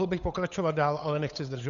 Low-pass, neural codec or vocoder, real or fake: 7.2 kHz; codec, 16 kHz, 8 kbps, FunCodec, trained on Chinese and English, 25 frames a second; fake